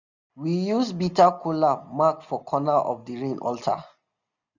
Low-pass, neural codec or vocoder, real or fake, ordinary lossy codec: 7.2 kHz; none; real; none